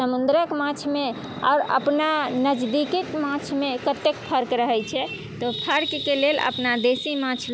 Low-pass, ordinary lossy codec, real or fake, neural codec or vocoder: none; none; real; none